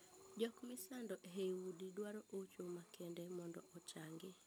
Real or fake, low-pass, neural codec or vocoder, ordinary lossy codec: real; none; none; none